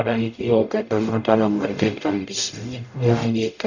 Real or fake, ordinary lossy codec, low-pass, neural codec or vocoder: fake; none; 7.2 kHz; codec, 44.1 kHz, 0.9 kbps, DAC